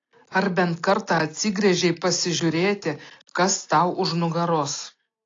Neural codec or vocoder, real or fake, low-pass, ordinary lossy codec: none; real; 7.2 kHz; AAC, 32 kbps